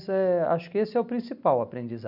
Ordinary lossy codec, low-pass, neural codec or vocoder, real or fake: none; 5.4 kHz; none; real